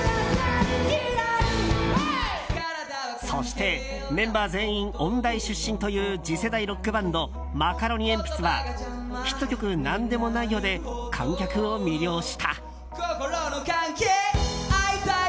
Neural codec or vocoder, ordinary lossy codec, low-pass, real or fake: none; none; none; real